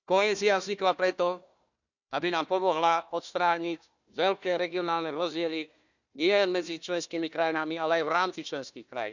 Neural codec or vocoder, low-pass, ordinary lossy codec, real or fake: codec, 16 kHz, 1 kbps, FunCodec, trained on Chinese and English, 50 frames a second; 7.2 kHz; none; fake